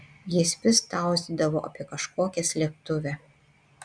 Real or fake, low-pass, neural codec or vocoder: real; 9.9 kHz; none